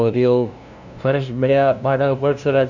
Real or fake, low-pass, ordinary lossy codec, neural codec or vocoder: fake; 7.2 kHz; none; codec, 16 kHz, 0.5 kbps, FunCodec, trained on LibriTTS, 25 frames a second